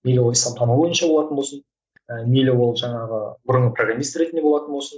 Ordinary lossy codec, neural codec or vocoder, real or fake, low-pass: none; none; real; none